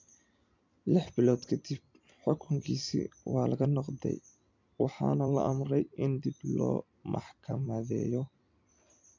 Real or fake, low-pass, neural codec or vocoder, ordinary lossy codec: fake; 7.2 kHz; vocoder, 44.1 kHz, 128 mel bands every 256 samples, BigVGAN v2; MP3, 64 kbps